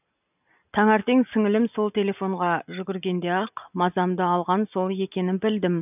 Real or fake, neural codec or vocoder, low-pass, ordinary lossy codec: real; none; 3.6 kHz; none